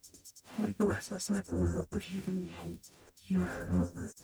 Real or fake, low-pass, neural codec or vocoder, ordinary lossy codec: fake; none; codec, 44.1 kHz, 0.9 kbps, DAC; none